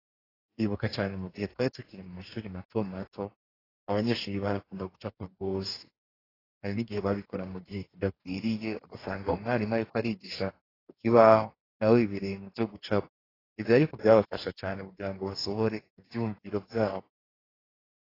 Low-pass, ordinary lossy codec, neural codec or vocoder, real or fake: 5.4 kHz; AAC, 24 kbps; codec, 44.1 kHz, 2.6 kbps, DAC; fake